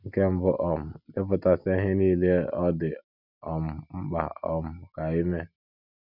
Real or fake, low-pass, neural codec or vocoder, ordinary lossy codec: real; 5.4 kHz; none; none